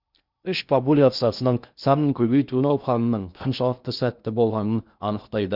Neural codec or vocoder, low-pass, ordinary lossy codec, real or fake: codec, 16 kHz in and 24 kHz out, 0.6 kbps, FocalCodec, streaming, 4096 codes; 5.4 kHz; Opus, 64 kbps; fake